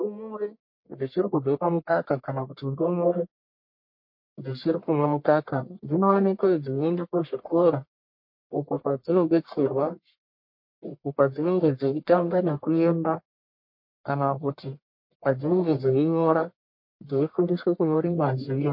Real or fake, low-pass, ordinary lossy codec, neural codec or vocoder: fake; 5.4 kHz; MP3, 32 kbps; codec, 44.1 kHz, 1.7 kbps, Pupu-Codec